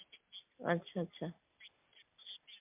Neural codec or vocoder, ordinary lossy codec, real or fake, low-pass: none; MP3, 32 kbps; real; 3.6 kHz